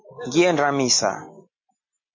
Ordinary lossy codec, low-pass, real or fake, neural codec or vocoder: MP3, 32 kbps; 7.2 kHz; real; none